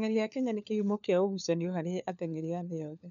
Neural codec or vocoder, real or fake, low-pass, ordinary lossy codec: codec, 16 kHz, 4 kbps, FunCodec, trained on LibriTTS, 50 frames a second; fake; 7.2 kHz; none